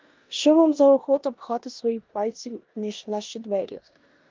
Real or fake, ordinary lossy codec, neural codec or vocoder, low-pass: fake; Opus, 24 kbps; codec, 16 kHz in and 24 kHz out, 0.9 kbps, LongCat-Audio-Codec, four codebook decoder; 7.2 kHz